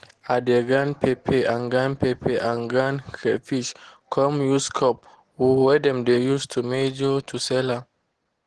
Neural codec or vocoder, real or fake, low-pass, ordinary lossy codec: none; real; 10.8 kHz; Opus, 16 kbps